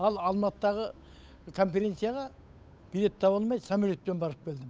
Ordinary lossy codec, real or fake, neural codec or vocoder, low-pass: none; fake; codec, 16 kHz, 8 kbps, FunCodec, trained on Chinese and English, 25 frames a second; none